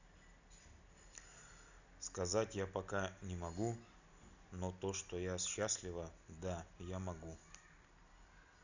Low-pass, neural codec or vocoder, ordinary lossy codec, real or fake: 7.2 kHz; none; none; real